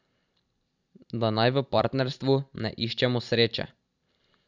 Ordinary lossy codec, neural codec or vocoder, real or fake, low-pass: none; vocoder, 44.1 kHz, 128 mel bands every 256 samples, BigVGAN v2; fake; 7.2 kHz